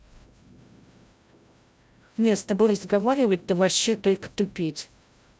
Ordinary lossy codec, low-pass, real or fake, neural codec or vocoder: none; none; fake; codec, 16 kHz, 0.5 kbps, FreqCodec, larger model